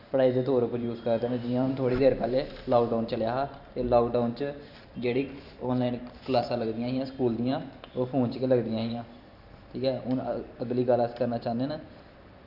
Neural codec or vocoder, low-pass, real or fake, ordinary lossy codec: vocoder, 44.1 kHz, 128 mel bands every 512 samples, BigVGAN v2; 5.4 kHz; fake; none